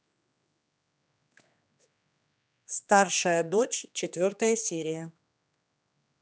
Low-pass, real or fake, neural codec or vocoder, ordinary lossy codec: none; fake; codec, 16 kHz, 2 kbps, X-Codec, HuBERT features, trained on general audio; none